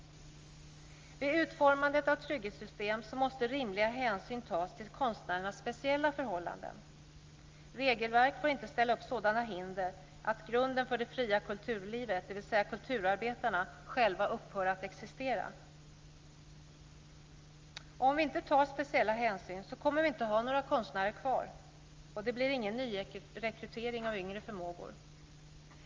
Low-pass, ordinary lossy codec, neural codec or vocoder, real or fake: 7.2 kHz; Opus, 32 kbps; none; real